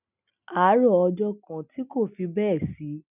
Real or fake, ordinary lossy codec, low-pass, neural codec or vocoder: real; none; 3.6 kHz; none